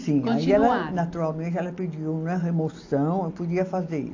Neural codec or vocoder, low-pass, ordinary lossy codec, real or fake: none; 7.2 kHz; AAC, 48 kbps; real